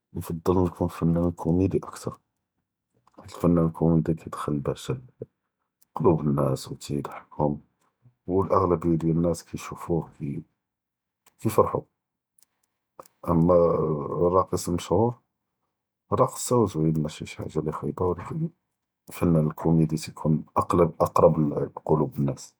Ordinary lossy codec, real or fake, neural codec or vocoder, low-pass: none; real; none; none